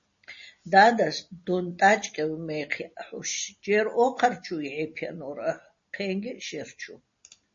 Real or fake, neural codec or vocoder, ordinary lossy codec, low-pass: real; none; MP3, 32 kbps; 7.2 kHz